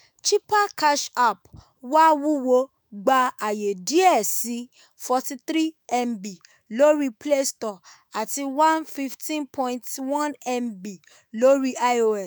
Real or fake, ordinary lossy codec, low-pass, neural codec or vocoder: fake; none; none; autoencoder, 48 kHz, 128 numbers a frame, DAC-VAE, trained on Japanese speech